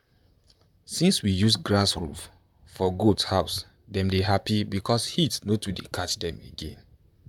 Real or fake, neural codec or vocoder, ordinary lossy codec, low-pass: fake; vocoder, 44.1 kHz, 128 mel bands, Pupu-Vocoder; none; 19.8 kHz